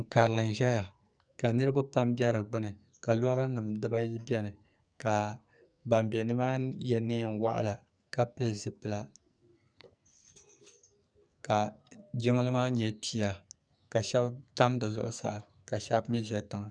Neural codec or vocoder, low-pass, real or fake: codec, 44.1 kHz, 2.6 kbps, SNAC; 9.9 kHz; fake